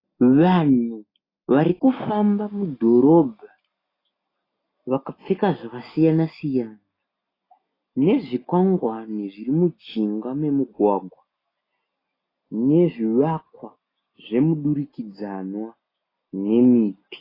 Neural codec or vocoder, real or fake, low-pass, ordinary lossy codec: none; real; 5.4 kHz; AAC, 24 kbps